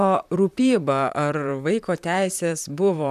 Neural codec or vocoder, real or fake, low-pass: vocoder, 44.1 kHz, 128 mel bands, Pupu-Vocoder; fake; 14.4 kHz